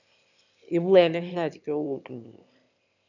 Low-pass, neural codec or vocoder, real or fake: 7.2 kHz; autoencoder, 22.05 kHz, a latent of 192 numbers a frame, VITS, trained on one speaker; fake